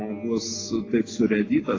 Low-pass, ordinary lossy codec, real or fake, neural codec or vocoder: 7.2 kHz; AAC, 32 kbps; real; none